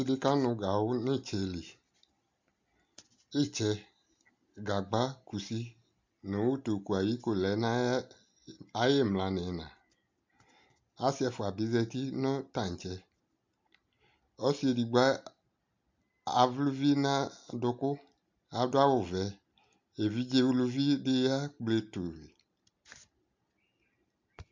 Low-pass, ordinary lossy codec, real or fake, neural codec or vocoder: 7.2 kHz; MP3, 48 kbps; real; none